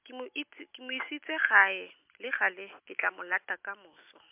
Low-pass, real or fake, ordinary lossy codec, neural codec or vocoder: 3.6 kHz; real; MP3, 32 kbps; none